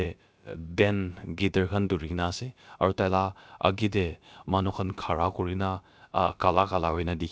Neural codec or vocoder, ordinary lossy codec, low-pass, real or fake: codec, 16 kHz, about 1 kbps, DyCAST, with the encoder's durations; none; none; fake